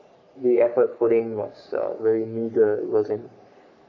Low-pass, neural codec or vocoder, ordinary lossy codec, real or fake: 7.2 kHz; codec, 44.1 kHz, 3.4 kbps, Pupu-Codec; none; fake